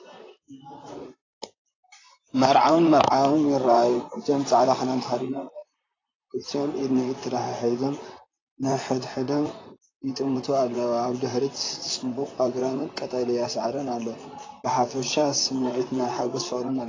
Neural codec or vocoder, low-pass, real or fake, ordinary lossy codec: vocoder, 44.1 kHz, 128 mel bands, Pupu-Vocoder; 7.2 kHz; fake; AAC, 32 kbps